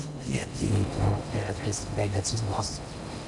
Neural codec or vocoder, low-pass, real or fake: codec, 16 kHz in and 24 kHz out, 0.6 kbps, FocalCodec, streaming, 4096 codes; 10.8 kHz; fake